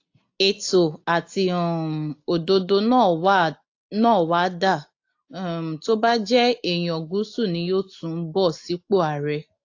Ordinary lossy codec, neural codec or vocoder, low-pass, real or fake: AAC, 48 kbps; none; 7.2 kHz; real